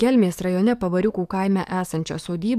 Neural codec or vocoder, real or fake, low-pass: codec, 44.1 kHz, 7.8 kbps, DAC; fake; 14.4 kHz